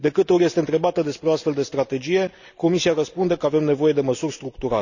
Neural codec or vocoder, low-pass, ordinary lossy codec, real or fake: none; 7.2 kHz; none; real